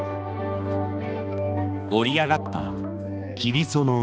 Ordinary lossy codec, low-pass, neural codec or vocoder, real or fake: none; none; codec, 16 kHz, 2 kbps, X-Codec, HuBERT features, trained on balanced general audio; fake